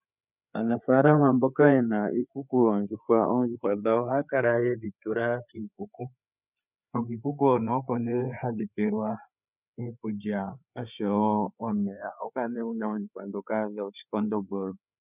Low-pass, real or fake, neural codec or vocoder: 3.6 kHz; fake; codec, 16 kHz, 4 kbps, FreqCodec, larger model